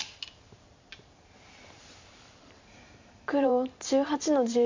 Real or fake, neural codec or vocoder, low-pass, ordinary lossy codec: fake; vocoder, 44.1 kHz, 128 mel bands every 512 samples, BigVGAN v2; 7.2 kHz; MP3, 64 kbps